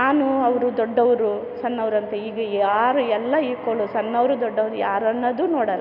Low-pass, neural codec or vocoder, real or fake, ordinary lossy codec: 5.4 kHz; none; real; none